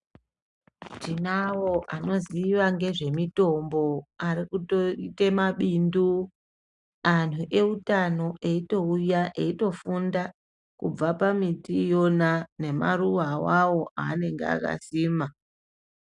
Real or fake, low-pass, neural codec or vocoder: real; 10.8 kHz; none